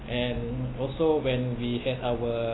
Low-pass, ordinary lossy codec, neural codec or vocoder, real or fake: 7.2 kHz; AAC, 16 kbps; none; real